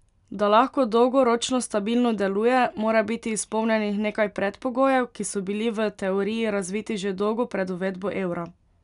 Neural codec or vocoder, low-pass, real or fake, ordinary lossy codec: none; 10.8 kHz; real; none